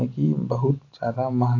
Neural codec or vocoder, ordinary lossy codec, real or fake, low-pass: none; AAC, 32 kbps; real; 7.2 kHz